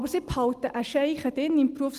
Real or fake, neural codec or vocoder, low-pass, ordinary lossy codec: real; none; 14.4 kHz; Opus, 24 kbps